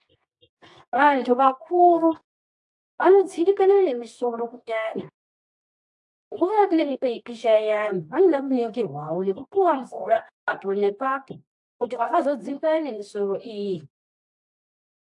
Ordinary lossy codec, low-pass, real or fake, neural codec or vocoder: AAC, 64 kbps; 10.8 kHz; fake; codec, 24 kHz, 0.9 kbps, WavTokenizer, medium music audio release